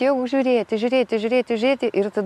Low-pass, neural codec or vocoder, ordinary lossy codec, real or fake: 14.4 kHz; none; MP3, 64 kbps; real